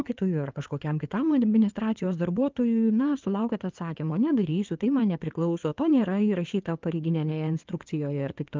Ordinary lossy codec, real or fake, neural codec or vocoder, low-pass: Opus, 24 kbps; fake; codec, 16 kHz in and 24 kHz out, 2.2 kbps, FireRedTTS-2 codec; 7.2 kHz